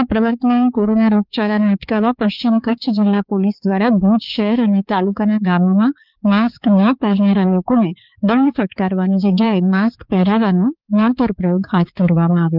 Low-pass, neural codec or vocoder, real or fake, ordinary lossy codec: 5.4 kHz; codec, 16 kHz, 4 kbps, X-Codec, HuBERT features, trained on balanced general audio; fake; Opus, 24 kbps